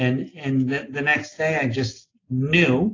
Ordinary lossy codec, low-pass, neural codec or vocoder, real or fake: AAC, 32 kbps; 7.2 kHz; none; real